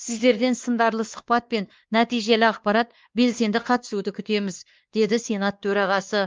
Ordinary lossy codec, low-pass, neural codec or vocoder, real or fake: Opus, 32 kbps; 7.2 kHz; codec, 16 kHz, 2 kbps, X-Codec, WavLM features, trained on Multilingual LibriSpeech; fake